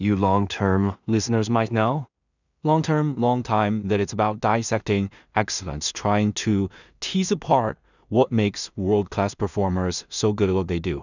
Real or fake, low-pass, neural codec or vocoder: fake; 7.2 kHz; codec, 16 kHz in and 24 kHz out, 0.4 kbps, LongCat-Audio-Codec, two codebook decoder